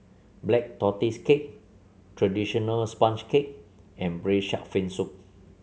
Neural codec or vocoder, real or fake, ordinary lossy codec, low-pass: none; real; none; none